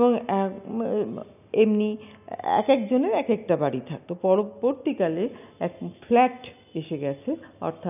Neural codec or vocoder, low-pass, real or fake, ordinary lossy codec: none; 3.6 kHz; real; none